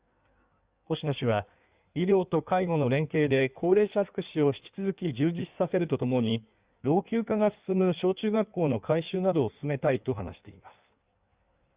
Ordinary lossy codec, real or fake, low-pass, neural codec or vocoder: Opus, 64 kbps; fake; 3.6 kHz; codec, 16 kHz in and 24 kHz out, 1.1 kbps, FireRedTTS-2 codec